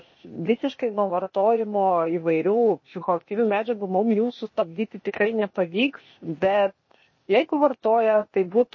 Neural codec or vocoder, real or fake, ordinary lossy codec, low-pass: codec, 16 kHz, 0.8 kbps, ZipCodec; fake; MP3, 32 kbps; 7.2 kHz